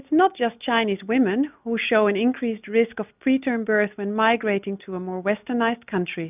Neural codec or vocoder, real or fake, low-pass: none; real; 3.6 kHz